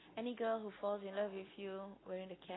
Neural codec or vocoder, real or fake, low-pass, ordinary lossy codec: none; real; 7.2 kHz; AAC, 16 kbps